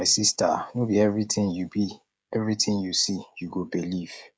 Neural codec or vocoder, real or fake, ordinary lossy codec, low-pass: codec, 16 kHz, 16 kbps, FreqCodec, smaller model; fake; none; none